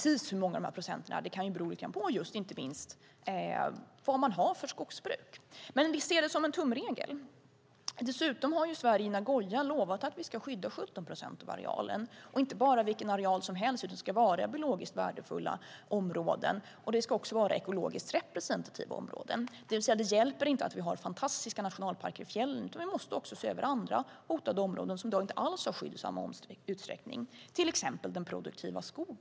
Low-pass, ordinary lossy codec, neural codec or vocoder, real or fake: none; none; none; real